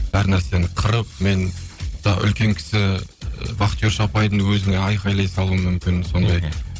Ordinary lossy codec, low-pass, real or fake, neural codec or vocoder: none; none; fake; codec, 16 kHz, 16 kbps, FunCodec, trained on Chinese and English, 50 frames a second